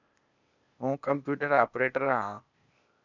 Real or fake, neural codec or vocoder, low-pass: fake; codec, 16 kHz, 0.8 kbps, ZipCodec; 7.2 kHz